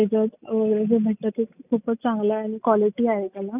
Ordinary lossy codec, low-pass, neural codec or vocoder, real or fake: none; 3.6 kHz; none; real